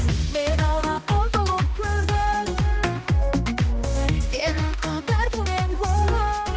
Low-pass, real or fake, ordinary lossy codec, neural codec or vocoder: none; fake; none; codec, 16 kHz, 1 kbps, X-Codec, HuBERT features, trained on balanced general audio